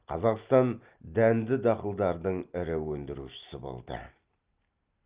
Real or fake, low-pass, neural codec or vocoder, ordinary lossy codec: real; 3.6 kHz; none; Opus, 32 kbps